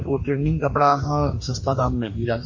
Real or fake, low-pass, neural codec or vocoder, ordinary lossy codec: fake; 7.2 kHz; codec, 44.1 kHz, 2.6 kbps, DAC; MP3, 32 kbps